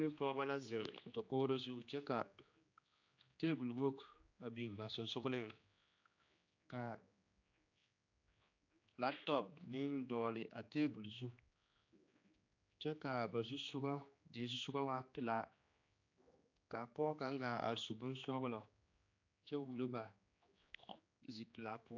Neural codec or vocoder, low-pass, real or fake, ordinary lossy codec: codec, 16 kHz, 2 kbps, X-Codec, HuBERT features, trained on general audio; 7.2 kHz; fake; AAC, 48 kbps